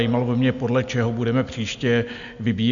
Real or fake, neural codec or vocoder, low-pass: real; none; 7.2 kHz